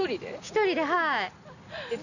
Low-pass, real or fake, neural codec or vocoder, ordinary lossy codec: 7.2 kHz; real; none; none